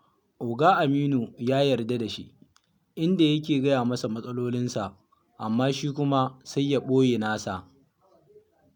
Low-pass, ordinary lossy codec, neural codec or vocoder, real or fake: 19.8 kHz; none; none; real